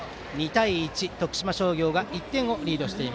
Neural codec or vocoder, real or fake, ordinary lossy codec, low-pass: none; real; none; none